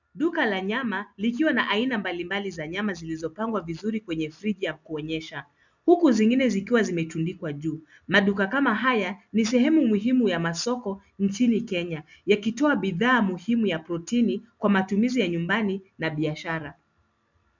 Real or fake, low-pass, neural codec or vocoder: real; 7.2 kHz; none